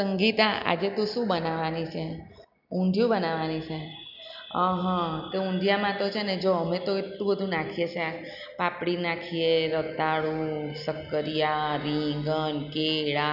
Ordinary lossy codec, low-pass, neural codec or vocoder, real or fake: none; 5.4 kHz; none; real